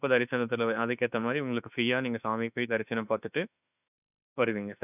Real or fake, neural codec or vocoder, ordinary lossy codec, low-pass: fake; autoencoder, 48 kHz, 32 numbers a frame, DAC-VAE, trained on Japanese speech; none; 3.6 kHz